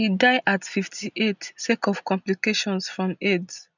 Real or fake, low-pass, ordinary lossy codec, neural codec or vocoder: real; 7.2 kHz; none; none